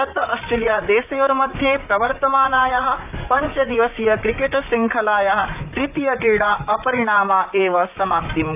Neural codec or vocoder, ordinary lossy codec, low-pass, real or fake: vocoder, 44.1 kHz, 128 mel bands, Pupu-Vocoder; none; 3.6 kHz; fake